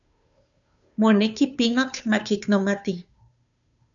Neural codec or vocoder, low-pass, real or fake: codec, 16 kHz, 2 kbps, FunCodec, trained on Chinese and English, 25 frames a second; 7.2 kHz; fake